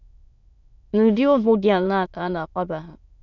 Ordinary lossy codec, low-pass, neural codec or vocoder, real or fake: none; 7.2 kHz; autoencoder, 22.05 kHz, a latent of 192 numbers a frame, VITS, trained on many speakers; fake